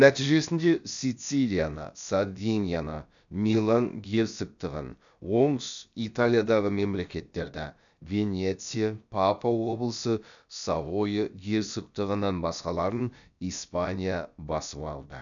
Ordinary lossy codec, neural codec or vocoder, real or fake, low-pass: none; codec, 16 kHz, about 1 kbps, DyCAST, with the encoder's durations; fake; 7.2 kHz